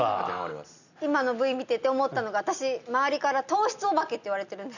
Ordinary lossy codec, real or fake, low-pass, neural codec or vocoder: none; real; 7.2 kHz; none